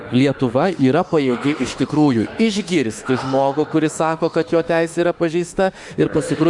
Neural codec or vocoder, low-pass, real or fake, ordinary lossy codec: autoencoder, 48 kHz, 32 numbers a frame, DAC-VAE, trained on Japanese speech; 10.8 kHz; fake; Opus, 64 kbps